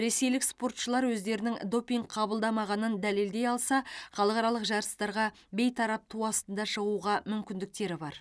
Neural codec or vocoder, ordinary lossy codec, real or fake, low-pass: none; none; real; none